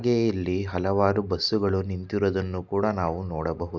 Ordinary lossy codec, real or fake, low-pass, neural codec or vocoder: none; real; 7.2 kHz; none